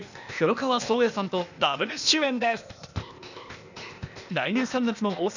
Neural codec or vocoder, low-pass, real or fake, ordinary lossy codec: codec, 16 kHz, 0.8 kbps, ZipCodec; 7.2 kHz; fake; none